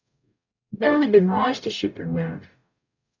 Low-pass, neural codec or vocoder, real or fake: 7.2 kHz; codec, 44.1 kHz, 0.9 kbps, DAC; fake